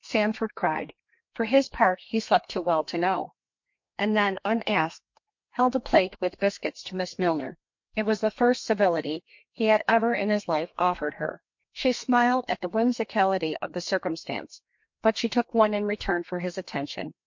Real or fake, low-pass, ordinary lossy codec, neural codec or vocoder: fake; 7.2 kHz; MP3, 48 kbps; codec, 32 kHz, 1.9 kbps, SNAC